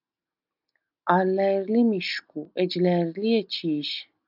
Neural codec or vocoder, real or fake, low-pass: none; real; 5.4 kHz